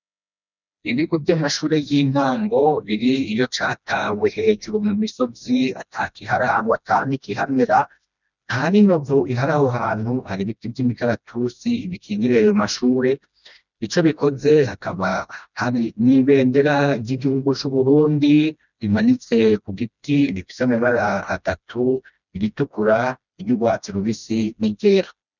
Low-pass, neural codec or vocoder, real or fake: 7.2 kHz; codec, 16 kHz, 1 kbps, FreqCodec, smaller model; fake